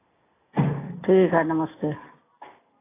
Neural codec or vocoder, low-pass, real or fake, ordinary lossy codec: none; 3.6 kHz; real; AAC, 16 kbps